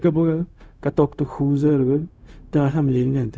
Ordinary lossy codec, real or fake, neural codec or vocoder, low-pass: none; fake; codec, 16 kHz, 0.4 kbps, LongCat-Audio-Codec; none